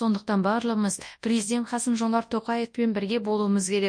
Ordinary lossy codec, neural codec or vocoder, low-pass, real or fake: MP3, 48 kbps; codec, 24 kHz, 0.9 kbps, WavTokenizer, large speech release; 9.9 kHz; fake